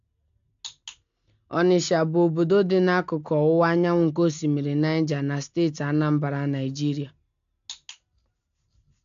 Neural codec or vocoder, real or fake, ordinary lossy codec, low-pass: none; real; AAC, 64 kbps; 7.2 kHz